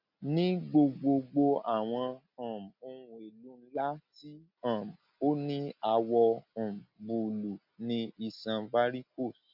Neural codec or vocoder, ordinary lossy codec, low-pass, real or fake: none; none; 5.4 kHz; real